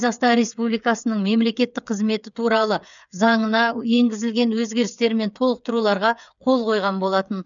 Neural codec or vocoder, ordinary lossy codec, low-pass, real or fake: codec, 16 kHz, 8 kbps, FreqCodec, smaller model; none; 7.2 kHz; fake